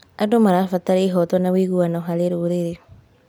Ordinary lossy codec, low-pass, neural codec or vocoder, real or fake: none; none; none; real